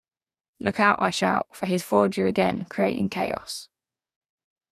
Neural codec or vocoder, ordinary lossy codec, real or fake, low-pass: codec, 44.1 kHz, 2.6 kbps, DAC; none; fake; 14.4 kHz